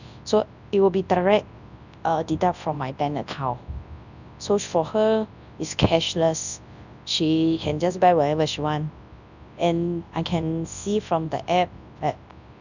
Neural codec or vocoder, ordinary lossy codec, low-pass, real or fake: codec, 24 kHz, 0.9 kbps, WavTokenizer, large speech release; none; 7.2 kHz; fake